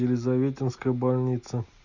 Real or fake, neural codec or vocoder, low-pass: real; none; 7.2 kHz